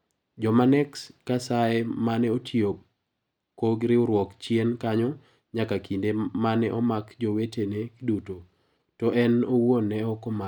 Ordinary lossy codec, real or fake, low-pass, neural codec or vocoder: none; real; 19.8 kHz; none